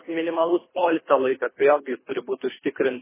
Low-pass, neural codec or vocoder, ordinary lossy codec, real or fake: 3.6 kHz; codec, 24 kHz, 3 kbps, HILCodec; MP3, 16 kbps; fake